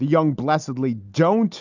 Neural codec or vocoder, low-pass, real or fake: none; 7.2 kHz; real